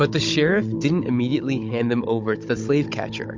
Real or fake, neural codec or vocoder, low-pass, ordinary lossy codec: fake; codec, 16 kHz, 16 kbps, FunCodec, trained on Chinese and English, 50 frames a second; 7.2 kHz; MP3, 48 kbps